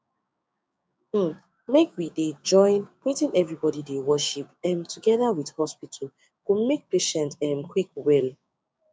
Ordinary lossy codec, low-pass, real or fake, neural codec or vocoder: none; none; fake; codec, 16 kHz, 6 kbps, DAC